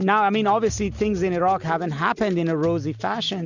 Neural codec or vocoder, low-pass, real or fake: none; 7.2 kHz; real